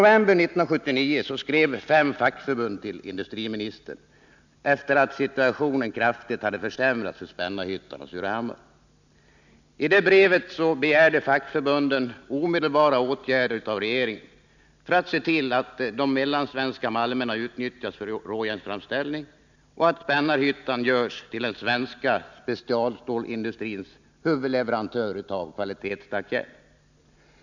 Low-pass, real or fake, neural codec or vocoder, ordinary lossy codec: 7.2 kHz; real; none; none